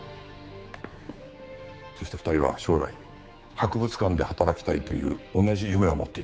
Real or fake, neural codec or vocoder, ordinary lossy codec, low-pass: fake; codec, 16 kHz, 4 kbps, X-Codec, HuBERT features, trained on general audio; none; none